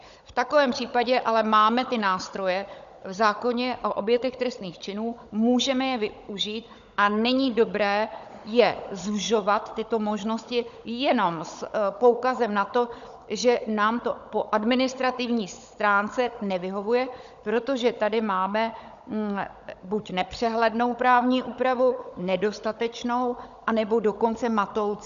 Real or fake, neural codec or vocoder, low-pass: fake; codec, 16 kHz, 16 kbps, FunCodec, trained on Chinese and English, 50 frames a second; 7.2 kHz